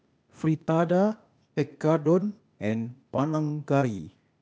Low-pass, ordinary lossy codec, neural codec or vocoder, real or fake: none; none; codec, 16 kHz, 0.8 kbps, ZipCodec; fake